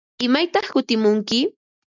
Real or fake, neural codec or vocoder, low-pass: real; none; 7.2 kHz